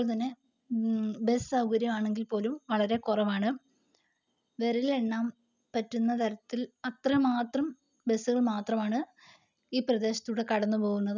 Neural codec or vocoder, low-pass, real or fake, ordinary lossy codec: none; 7.2 kHz; real; none